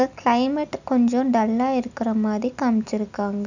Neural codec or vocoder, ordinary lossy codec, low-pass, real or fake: none; none; 7.2 kHz; real